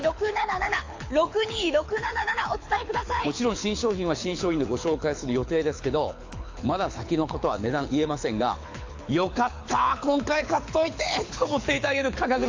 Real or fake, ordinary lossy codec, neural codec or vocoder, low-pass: fake; AAC, 48 kbps; codec, 24 kHz, 6 kbps, HILCodec; 7.2 kHz